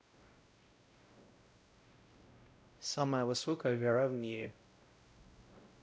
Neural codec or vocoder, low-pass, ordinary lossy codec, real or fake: codec, 16 kHz, 0.5 kbps, X-Codec, WavLM features, trained on Multilingual LibriSpeech; none; none; fake